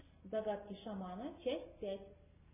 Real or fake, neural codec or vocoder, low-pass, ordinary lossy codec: real; none; 3.6 kHz; MP3, 16 kbps